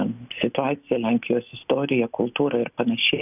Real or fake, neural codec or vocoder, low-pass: real; none; 3.6 kHz